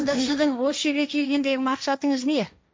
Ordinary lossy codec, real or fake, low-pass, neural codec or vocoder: none; fake; none; codec, 16 kHz, 1.1 kbps, Voila-Tokenizer